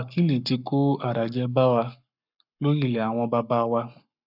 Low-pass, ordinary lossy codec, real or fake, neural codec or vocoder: 5.4 kHz; none; fake; codec, 44.1 kHz, 7.8 kbps, Pupu-Codec